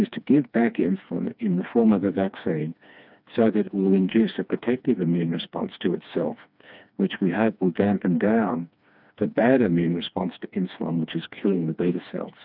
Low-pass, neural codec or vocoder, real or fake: 5.4 kHz; codec, 16 kHz, 2 kbps, FreqCodec, smaller model; fake